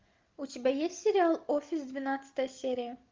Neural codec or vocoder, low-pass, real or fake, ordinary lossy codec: none; 7.2 kHz; real; Opus, 24 kbps